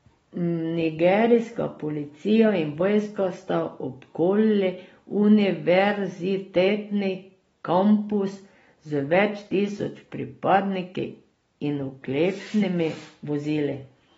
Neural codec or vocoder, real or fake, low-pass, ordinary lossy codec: none; real; 19.8 kHz; AAC, 24 kbps